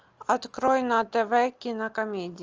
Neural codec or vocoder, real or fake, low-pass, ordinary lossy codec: none; real; 7.2 kHz; Opus, 24 kbps